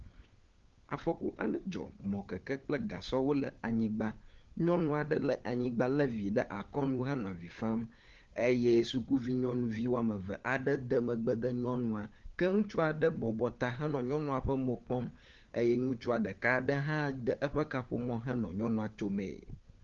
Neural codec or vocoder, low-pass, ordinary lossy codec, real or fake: codec, 16 kHz, 4 kbps, FunCodec, trained on LibriTTS, 50 frames a second; 7.2 kHz; Opus, 32 kbps; fake